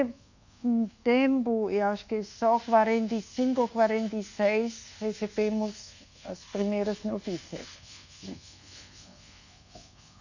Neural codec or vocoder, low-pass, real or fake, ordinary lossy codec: codec, 24 kHz, 1.2 kbps, DualCodec; 7.2 kHz; fake; none